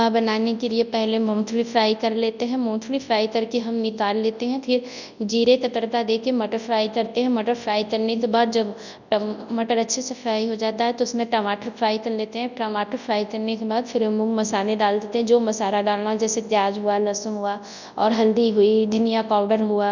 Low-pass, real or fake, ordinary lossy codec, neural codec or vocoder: 7.2 kHz; fake; none; codec, 24 kHz, 0.9 kbps, WavTokenizer, large speech release